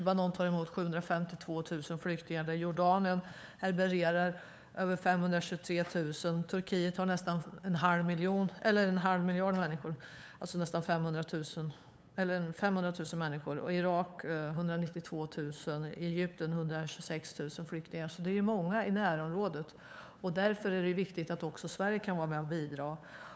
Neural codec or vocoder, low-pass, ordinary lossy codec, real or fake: codec, 16 kHz, 8 kbps, FunCodec, trained on LibriTTS, 25 frames a second; none; none; fake